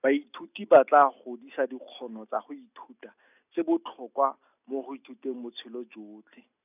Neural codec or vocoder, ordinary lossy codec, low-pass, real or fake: none; none; 3.6 kHz; real